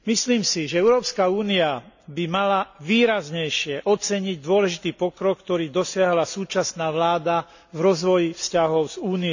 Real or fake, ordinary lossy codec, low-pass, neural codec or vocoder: real; none; 7.2 kHz; none